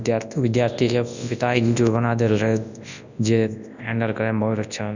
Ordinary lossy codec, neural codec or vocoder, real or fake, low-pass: none; codec, 24 kHz, 0.9 kbps, WavTokenizer, large speech release; fake; 7.2 kHz